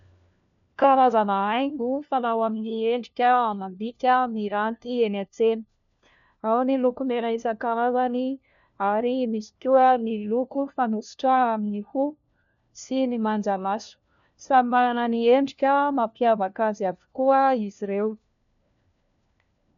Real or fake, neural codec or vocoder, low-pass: fake; codec, 16 kHz, 1 kbps, FunCodec, trained on LibriTTS, 50 frames a second; 7.2 kHz